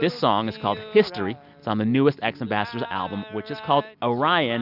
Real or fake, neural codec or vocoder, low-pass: real; none; 5.4 kHz